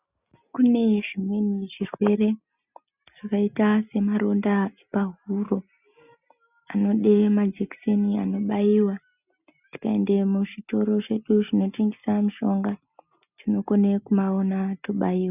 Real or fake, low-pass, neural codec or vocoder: real; 3.6 kHz; none